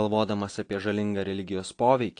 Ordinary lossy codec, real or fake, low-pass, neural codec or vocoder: AAC, 48 kbps; real; 9.9 kHz; none